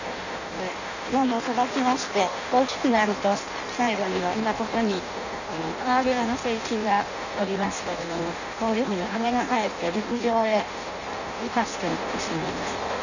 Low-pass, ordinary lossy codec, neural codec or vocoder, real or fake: 7.2 kHz; none; codec, 16 kHz in and 24 kHz out, 0.6 kbps, FireRedTTS-2 codec; fake